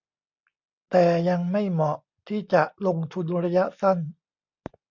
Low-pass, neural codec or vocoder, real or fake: 7.2 kHz; none; real